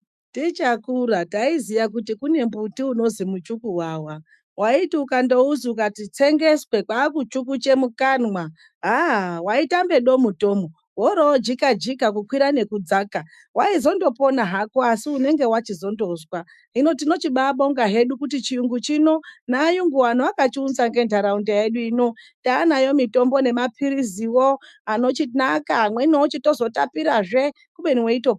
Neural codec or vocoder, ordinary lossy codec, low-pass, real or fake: autoencoder, 48 kHz, 128 numbers a frame, DAC-VAE, trained on Japanese speech; MP3, 96 kbps; 14.4 kHz; fake